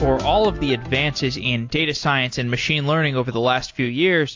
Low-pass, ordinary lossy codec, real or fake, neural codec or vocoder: 7.2 kHz; AAC, 48 kbps; real; none